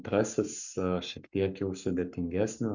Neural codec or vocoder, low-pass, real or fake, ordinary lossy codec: codec, 44.1 kHz, 7.8 kbps, Pupu-Codec; 7.2 kHz; fake; Opus, 64 kbps